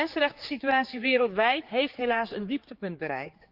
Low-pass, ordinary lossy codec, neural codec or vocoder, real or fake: 5.4 kHz; Opus, 24 kbps; codec, 16 kHz, 4 kbps, X-Codec, HuBERT features, trained on general audio; fake